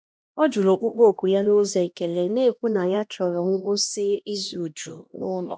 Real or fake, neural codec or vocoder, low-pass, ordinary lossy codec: fake; codec, 16 kHz, 1 kbps, X-Codec, HuBERT features, trained on LibriSpeech; none; none